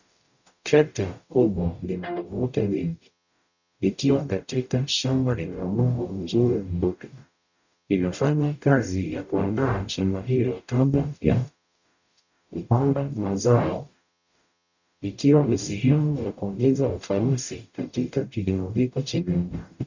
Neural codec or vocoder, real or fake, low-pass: codec, 44.1 kHz, 0.9 kbps, DAC; fake; 7.2 kHz